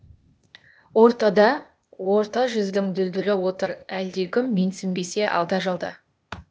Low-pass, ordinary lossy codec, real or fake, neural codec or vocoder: none; none; fake; codec, 16 kHz, 0.8 kbps, ZipCodec